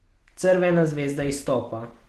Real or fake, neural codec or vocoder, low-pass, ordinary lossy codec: real; none; 14.4 kHz; Opus, 16 kbps